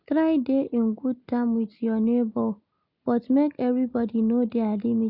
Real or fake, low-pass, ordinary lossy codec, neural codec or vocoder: real; 5.4 kHz; none; none